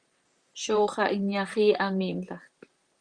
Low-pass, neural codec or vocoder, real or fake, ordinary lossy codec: 9.9 kHz; vocoder, 44.1 kHz, 128 mel bands, Pupu-Vocoder; fake; Opus, 32 kbps